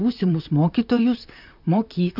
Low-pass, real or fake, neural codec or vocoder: 5.4 kHz; fake; vocoder, 44.1 kHz, 128 mel bands, Pupu-Vocoder